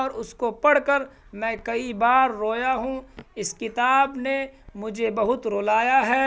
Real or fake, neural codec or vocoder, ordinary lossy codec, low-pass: real; none; none; none